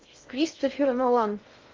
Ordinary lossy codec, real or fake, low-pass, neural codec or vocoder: Opus, 32 kbps; fake; 7.2 kHz; codec, 16 kHz in and 24 kHz out, 0.6 kbps, FocalCodec, streaming, 2048 codes